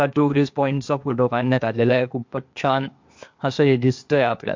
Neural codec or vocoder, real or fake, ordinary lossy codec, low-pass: codec, 16 kHz, 0.8 kbps, ZipCodec; fake; MP3, 48 kbps; 7.2 kHz